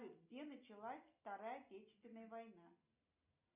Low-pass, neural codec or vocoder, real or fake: 3.6 kHz; none; real